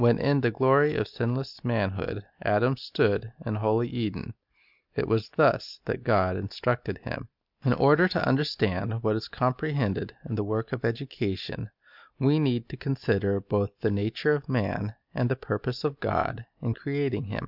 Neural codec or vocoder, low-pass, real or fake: none; 5.4 kHz; real